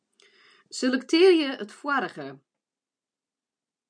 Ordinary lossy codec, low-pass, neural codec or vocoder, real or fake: MP3, 96 kbps; 9.9 kHz; none; real